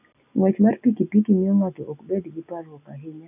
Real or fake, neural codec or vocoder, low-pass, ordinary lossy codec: real; none; 3.6 kHz; none